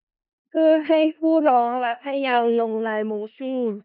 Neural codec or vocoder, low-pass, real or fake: codec, 16 kHz in and 24 kHz out, 0.4 kbps, LongCat-Audio-Codec, four codebook decoder; 5.4 kHz; fake